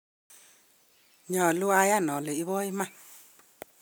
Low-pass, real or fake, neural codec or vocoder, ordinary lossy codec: none; real; none; none